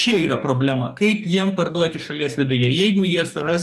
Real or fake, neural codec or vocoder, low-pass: fake; codec, 44.1 kHz, 2.6 kbps, DAC; 14.4 kHz